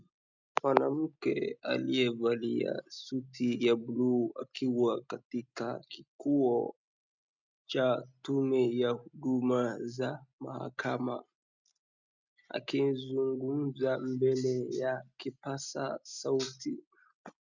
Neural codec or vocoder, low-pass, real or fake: none; 7.2 kHz; real